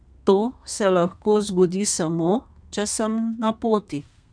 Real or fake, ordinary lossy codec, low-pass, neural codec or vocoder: fake; none; 9.9 kHz; codec, 32 kHz, 1.9 kbps, SNAC